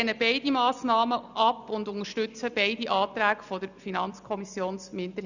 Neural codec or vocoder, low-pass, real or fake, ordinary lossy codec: none; 7.2 kHz; real; none